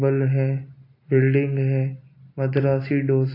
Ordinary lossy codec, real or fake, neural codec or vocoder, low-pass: AAC, 32 kbps; real; none; 5.4 kHz